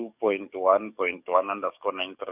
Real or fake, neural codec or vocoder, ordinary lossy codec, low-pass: fake; codec, 44.1 kHz, 7.8 kbps, DAC; none; 3.6 kHz